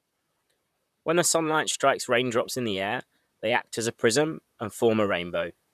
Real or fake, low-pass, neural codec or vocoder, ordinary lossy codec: fake; 14.4 kHz; vocoder, 44.1 kHz, 128 mel bands, Pupu-Vocoder; none